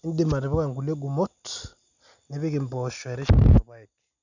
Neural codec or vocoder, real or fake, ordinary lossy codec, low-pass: none; real; none; 7.2 kHz